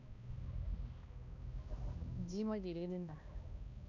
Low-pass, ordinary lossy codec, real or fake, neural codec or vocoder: 7.2 kHz; none; fake; codec, 16 kHz, 1 kbps, X-Codec, HuBERT features, trained on balanced general audio